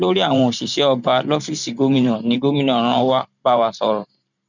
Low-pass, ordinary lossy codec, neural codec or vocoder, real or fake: 7.2 kHz; none; vocoder, 44.1 kHz, 128 mel bands every 512 samples, BigVGAN v2; fake